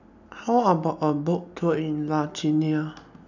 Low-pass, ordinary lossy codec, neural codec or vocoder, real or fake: 7.2 kHz; none; none; real